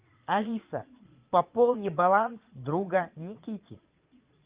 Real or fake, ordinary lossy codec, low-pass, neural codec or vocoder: fake; Opus, 24 kbps; 3.6 kHz; vocoder, 22.05 kHz, 80 mel bands, Vocos